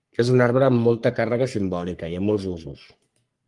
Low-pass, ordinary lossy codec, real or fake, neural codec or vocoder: 10.8 kHz; Opus, 32 kbps; fake; codec, 44.1 kHz, 3.4 kbps, Pupu-Codec